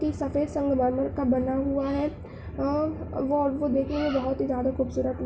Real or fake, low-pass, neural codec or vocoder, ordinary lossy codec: real; none; none; none